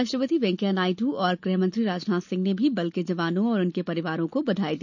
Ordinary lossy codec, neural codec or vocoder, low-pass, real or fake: none; none; 7.2 kHz; real